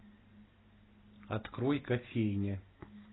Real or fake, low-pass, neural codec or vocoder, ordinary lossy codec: real; 7.2 kHz; none; AAC, 16 kbps